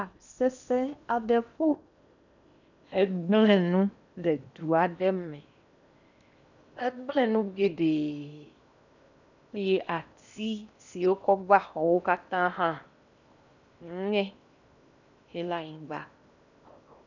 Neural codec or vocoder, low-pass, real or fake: codec, 16 kHz in and 24 kHz out, 0.8 kbps, FocalCodec, streaming, 65536 codes; 7.2 kHz; fake